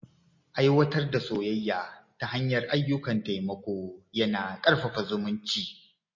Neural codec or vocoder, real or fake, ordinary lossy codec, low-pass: none; real; MP3, 32 kbps; 7.2 kHz